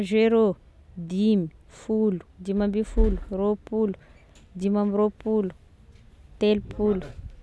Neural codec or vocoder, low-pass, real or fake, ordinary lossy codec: none; none; real; none